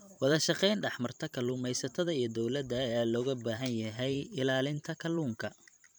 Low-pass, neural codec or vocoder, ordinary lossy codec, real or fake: none; vocoder, 44.1 kHz, 128 mel bands every 512 samples, BigVGAN v2; none; fake